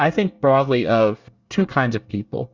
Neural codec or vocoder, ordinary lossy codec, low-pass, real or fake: codec, 24 kHz, 1 kbps, SNAC; Opus, 64 kbps; 7.2 kHz; fake